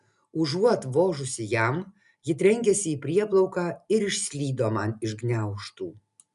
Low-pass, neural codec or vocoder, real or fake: 10.8 kHz; none; real